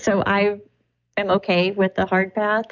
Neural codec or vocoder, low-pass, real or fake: none; 7.2 kHz; real